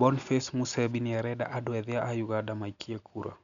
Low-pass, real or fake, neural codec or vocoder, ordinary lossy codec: 7.2 kHz; real; none; none